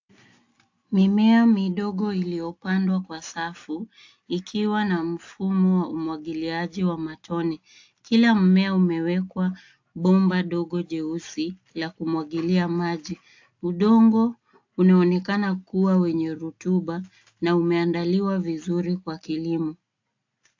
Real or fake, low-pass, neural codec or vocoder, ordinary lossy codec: real; 7.2 kHz; none; AAC, 48 kbps